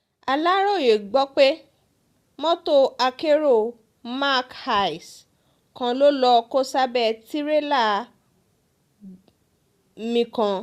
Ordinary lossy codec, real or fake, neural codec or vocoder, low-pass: Opus, 64 kbps; real; none; 14.4 kHz